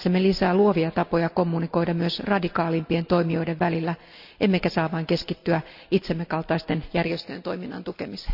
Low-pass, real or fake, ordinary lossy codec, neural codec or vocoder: 5.4 kHz; real; none; none